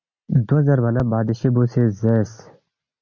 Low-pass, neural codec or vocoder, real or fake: 7.2 kHz; none; real